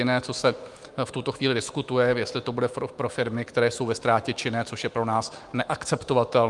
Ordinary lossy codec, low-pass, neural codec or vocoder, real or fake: Opus, 32 kbps; 10.8 kHz; autoencoder, 48 kHz, 128 numbers a frame, DAC-VAE, trained on Japanese speech; fake